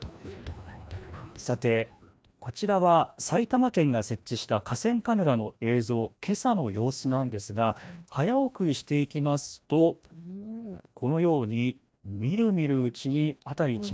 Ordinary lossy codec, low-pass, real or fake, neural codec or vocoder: none; none; fake; codec, 16 kHz, 1 kbps, FreqCodec, larger model